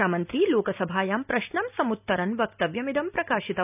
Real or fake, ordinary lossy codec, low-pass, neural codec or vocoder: real; none; 3.6 kHz; none